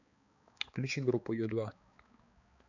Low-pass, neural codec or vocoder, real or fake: 7.2 kHz; codec, 16 kHz, 4 kbps, X-Codec, HuBERT features, trained on balanced general audio; fake